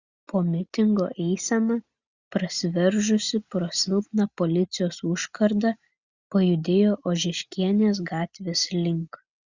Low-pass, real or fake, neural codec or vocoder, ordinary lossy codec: 7.2 kHz; real; none; Opus, 64 kbps